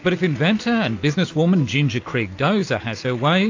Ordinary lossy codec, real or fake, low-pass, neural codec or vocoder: AAC, 48 kbps; real; 7.2 kHz; none